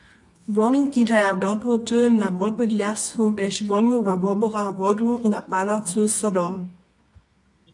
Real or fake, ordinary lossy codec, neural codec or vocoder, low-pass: fake; AAC, 64 kbps; codec, 24 kHz, 0.9 kbps, WavTokenizer, medium music audio release; 10.8 kHz